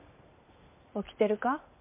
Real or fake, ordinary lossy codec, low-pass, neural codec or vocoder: real; MP3, 32 kbps; 3.6 kHz; none